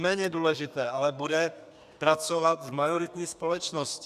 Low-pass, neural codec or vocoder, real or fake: 14.4 kHz; codec, 32 kHz, 1.9 kbps, SNAC; fake